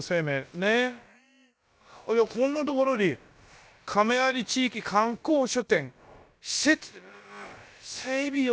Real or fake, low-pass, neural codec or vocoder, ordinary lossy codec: fake; none; codec, 16 kHz, about 1 kbps, DyCAST, with the encoder's durations; none